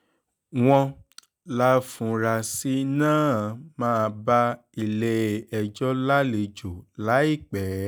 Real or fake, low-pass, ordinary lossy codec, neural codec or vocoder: real; none; none; none